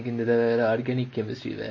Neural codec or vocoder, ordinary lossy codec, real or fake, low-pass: none; MP3, 32 kbps; real; 7.2 kHz